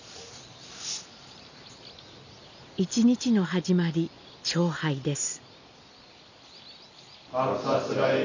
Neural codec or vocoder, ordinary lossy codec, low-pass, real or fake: none; none; 7.2 kHz; real